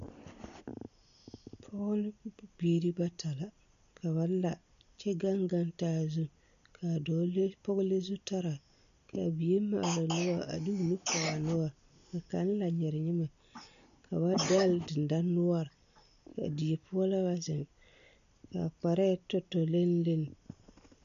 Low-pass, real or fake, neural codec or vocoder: 7.2 kHz; real; none